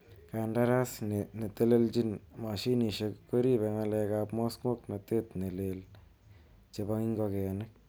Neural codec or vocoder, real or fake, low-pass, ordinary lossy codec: none; real; none; none